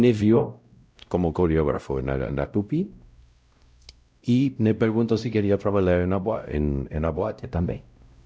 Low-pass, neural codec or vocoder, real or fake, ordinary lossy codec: none; codec, 16 kHz, 0.5 kbps, X-Codec, WavLM features, trained on Multilingual LibriSpeech; fake; none